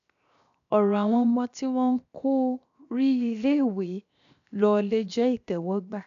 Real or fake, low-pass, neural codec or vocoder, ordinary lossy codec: fake; 7.2 kHz; codec, 16 kHz, 0.7 kbps, FocalCodec; none